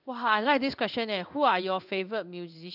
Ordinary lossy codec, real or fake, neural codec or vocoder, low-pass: none; fake; codec, 16 kHz in and 24 kHz out, 1 kbps, XY-Tokenizer; 5.4 kHz